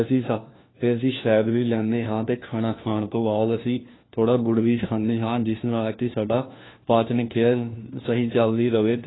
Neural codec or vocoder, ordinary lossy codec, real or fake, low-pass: codec, 16 kHz, 1 kbps, FunCodec, trained on LibriTTS, 50 frames a second; AAC, 16 kbps; fake; 7.2 kHz